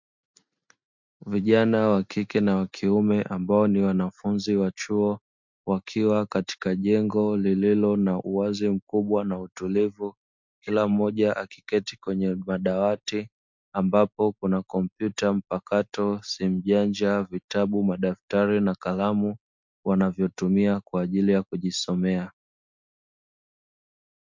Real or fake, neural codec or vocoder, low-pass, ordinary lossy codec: real; none; 7.2 kHz; MP3, 64 kbps